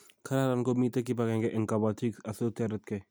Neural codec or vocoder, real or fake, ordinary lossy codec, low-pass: none; real; none; none